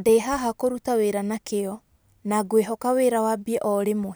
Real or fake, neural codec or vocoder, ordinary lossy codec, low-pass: real; none; none; none